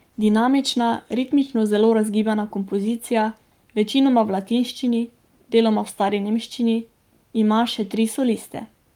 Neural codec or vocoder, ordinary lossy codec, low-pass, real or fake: codec, 44.1 kHz, 7.8 kbps, Pupu-Codec; Opus, 32 kbps; 19.8 kHz; fake